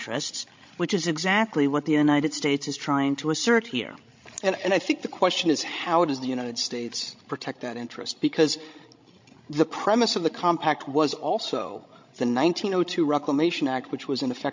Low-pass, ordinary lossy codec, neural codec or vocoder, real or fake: 7.2 kHz; MP3, 48 kbps; codec, 16 kHz, 16 kbps, FreqCodec, larger model; fake